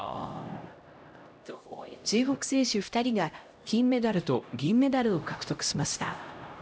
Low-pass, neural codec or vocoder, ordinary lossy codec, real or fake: none; codec, 16 kHz, 0.5 kbps, X-Codec, HuBERT features, trained on LibriSpeech; none; fake